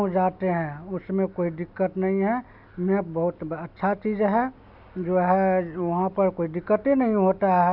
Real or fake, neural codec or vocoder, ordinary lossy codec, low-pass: real; none; none; 5.4 kHz